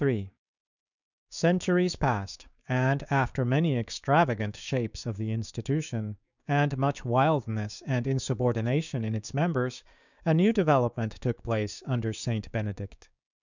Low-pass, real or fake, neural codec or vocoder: 7.2 kHz; fake; codec, 16 kHz, 6 kbps, DAC